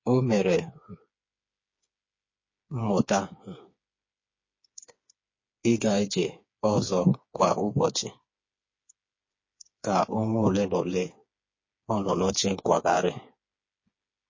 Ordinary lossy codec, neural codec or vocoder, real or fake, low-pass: MP3, 32 kbps; codec, 16 kHz, 4 kbps, FreqCodec, smaller model; fake; 7.2 kHz